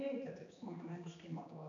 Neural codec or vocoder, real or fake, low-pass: codec, 16 kHz, 2 kbps, X-Codec, HuBERT features, trained on general audio; fake; 7.2 kHz